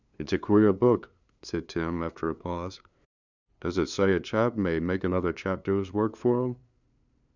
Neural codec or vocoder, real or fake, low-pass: codec, 16 kHz, 2 kbps, FunCodec, trained on LibriTTS, 25 frames a second; fake; 7.2 kHz